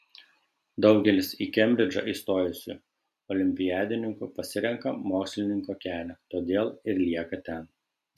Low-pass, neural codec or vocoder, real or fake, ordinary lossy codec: 14.4 kHz; none; real; MP3, 96 kbps